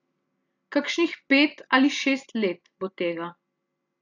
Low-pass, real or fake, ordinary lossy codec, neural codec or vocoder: 7.2 kHz; real; AAC, 48 kbps; none